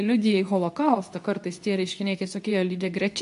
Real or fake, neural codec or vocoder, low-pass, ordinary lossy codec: fake; codec, 24 kHz, 0.9 kbps, WavTokenizer, medium speech release version 2; 10.8 kHz; AAC, 64 kbps